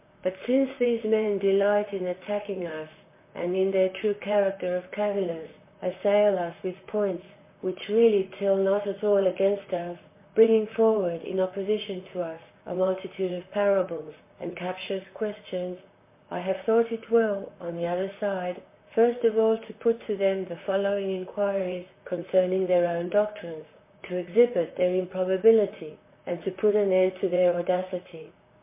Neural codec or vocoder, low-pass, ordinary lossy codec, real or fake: vocoder, 44.1 kHz, 128 mel bands, Pupu-Vocoder; 3.6 kHz; MP3, 24 kbps; fake